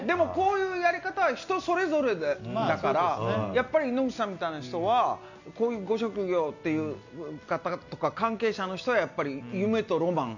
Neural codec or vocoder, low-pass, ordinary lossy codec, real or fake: none; 7.2 kHz; none; real